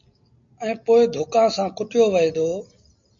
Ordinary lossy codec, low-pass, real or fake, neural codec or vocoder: MP3, 48 kbps; 7.2 kHz; real; none